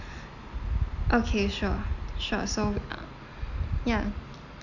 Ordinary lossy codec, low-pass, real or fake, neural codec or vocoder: none; 7.2 kHz; real; none